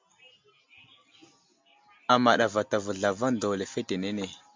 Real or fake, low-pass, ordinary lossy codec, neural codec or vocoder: real; 7.2 kHz; MP3, 64 kbps; none